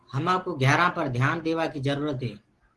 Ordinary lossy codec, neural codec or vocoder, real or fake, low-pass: Opus, 16 kbps; none; real; 10.8 kHz